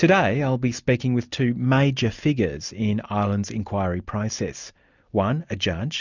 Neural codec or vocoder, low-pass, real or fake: none; 7.2 kHz; real